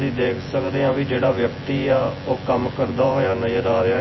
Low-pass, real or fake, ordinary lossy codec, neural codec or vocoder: 7.2 kHz; fake; MP3, 24 kbps; vocoder, 24 kHz, 100 mel bands, Vocos